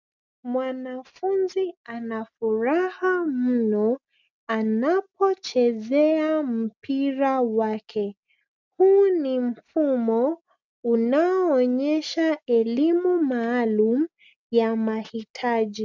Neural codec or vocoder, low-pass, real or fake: none; 7.2 kHz; real